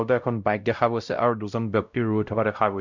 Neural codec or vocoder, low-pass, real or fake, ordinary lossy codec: codec, 16 kHz, 0.5 kbps, X-Codec, WavLM features, trained on Multilingual LibriSpeech; 7.2 kHz; fake; none